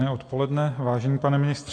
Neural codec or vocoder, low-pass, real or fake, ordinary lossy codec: none; 9.9 kHz; real; AAC, 48 kbps